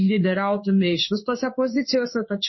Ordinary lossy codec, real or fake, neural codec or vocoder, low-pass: MP3, 24 kbps; fake; codec, 16 kHz in and 24 kHz out, 1 kbps, XY-Tokenizer; 7.2 kHz